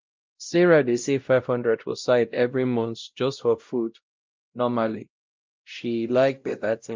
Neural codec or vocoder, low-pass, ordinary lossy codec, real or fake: codec, 16 kHz, 0.5 kbps, X-Codec, WavLM features, trained on Multilingual LibriSpeech; 7.2 kHz; Opus, 24 kbps; fake